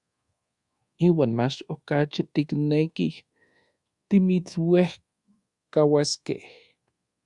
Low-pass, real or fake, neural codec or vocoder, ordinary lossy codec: 10.8 kHz; fake; codec, 24 kHz, 1.2 kbps, DualCodec; Opus, 64 kbps